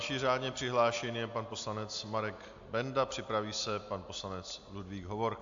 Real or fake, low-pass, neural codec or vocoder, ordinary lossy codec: real; 7.2 kHz; none; AAC, 64 kbps